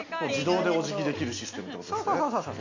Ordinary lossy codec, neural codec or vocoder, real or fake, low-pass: MP3, 48 kbps; none; real; 7.2 kHz